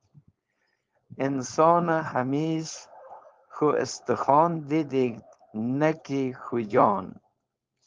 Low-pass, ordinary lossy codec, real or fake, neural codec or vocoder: 7.2 kHz; Opus, 24 kbps; fake; codec, 16 kHz, 4.8 kbps, FACodec